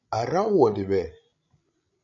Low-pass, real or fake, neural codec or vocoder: 7.2 kHz; fake; codec, 16 kHz, 16 kbps, FreqCodec, larger model